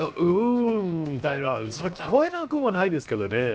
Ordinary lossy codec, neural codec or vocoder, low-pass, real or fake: none; codec, 16 kHz, 0.7 kbps, FocalCodec; none; fake